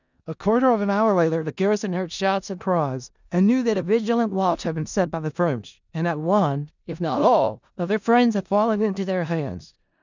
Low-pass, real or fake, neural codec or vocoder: 7.2 kHz; fake; codec, 16 kHz in and 24 kHz out, 0.4 kbps, LongCat-Audio-Codec, four codebook decoder